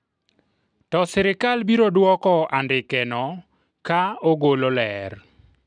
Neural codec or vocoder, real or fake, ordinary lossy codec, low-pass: none; real; none; 9.9 kHz